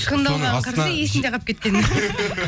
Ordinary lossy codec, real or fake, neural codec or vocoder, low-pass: none; real; none; none